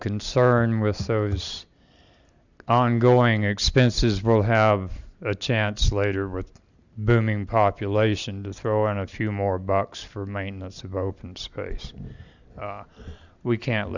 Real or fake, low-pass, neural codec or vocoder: real; 7.2 kHz; none